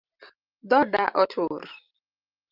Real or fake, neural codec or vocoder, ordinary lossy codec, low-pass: real; none; Opus, 32 kbps; 5.4 kHz